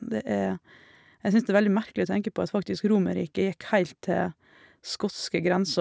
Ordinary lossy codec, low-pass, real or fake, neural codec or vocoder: none; none; real; none